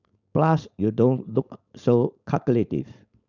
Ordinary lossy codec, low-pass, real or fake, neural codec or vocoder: none; 7.2 kHz; fake; codec, 16 kHz, 4.8 kbps, FACodec